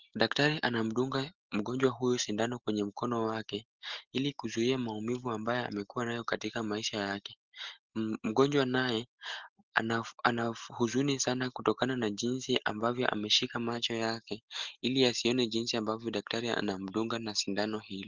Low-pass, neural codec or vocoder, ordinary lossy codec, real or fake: 7.2 kHz; none; Opus, 16 kbps; real